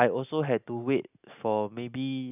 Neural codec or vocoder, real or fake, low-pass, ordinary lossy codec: none; real; 3.6 kHz; none